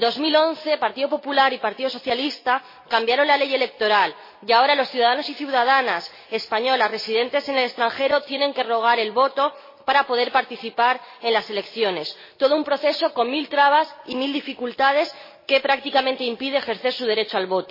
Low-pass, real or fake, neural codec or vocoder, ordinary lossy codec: 5.4 kHz; real; none; MP3, 24 kbps